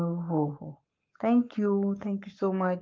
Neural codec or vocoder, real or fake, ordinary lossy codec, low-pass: codec, 44.1 kHz, 7.8 kbps, Pupu-Codec; fake; Opus, 24 kbps; 7.2 kHz